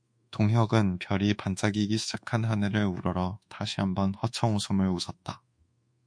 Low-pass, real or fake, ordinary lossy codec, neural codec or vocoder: 9.9 kHz; fake; MP3, 48 kbps; codec, 24 kHz, 1.2 kbps, DualCodec